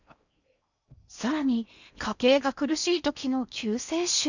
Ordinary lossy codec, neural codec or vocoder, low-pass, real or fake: none; codec, 16 kHz in and 24 kHz out, 0.8 kbps, FocalCodec, streaming, 65536 codes; 7.2 kHz; fake